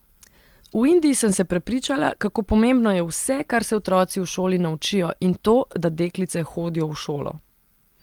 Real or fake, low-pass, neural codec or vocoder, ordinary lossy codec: real; 19.8 kHz; none; Opus, 24 kbps